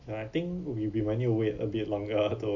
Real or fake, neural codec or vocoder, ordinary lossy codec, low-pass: real; none; MP3, 48 kbps; 7.2 kHz